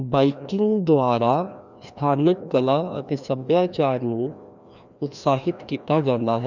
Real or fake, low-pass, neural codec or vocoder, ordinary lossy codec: fake; 7.2 kHz; codec, 16 kHz, 1 kbps, FreqCodec, larger model; none